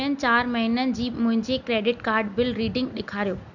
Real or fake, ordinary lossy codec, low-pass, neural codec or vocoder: real; none; 7.2 kHz; none